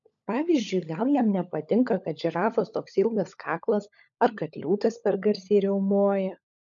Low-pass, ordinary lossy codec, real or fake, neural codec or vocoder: 7.2 kHz; MP3, 96 kbps; fake; codec, 16 kHz, 16 kbps, FunCodec, trained on LibriTTS, 50 frames a second